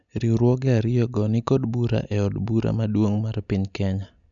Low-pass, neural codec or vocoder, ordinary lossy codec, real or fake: 7.2 kHz; none; none; real